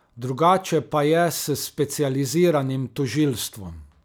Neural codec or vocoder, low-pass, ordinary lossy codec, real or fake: none; none; none; real